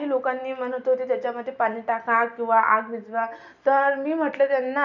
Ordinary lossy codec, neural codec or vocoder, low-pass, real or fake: none; none; 7.2 kHz; real